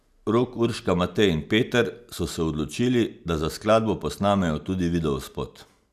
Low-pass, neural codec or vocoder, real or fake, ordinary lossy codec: 14.4 kHz; none; real; none